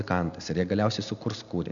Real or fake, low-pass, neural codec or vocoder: real; 7.2 kHz; none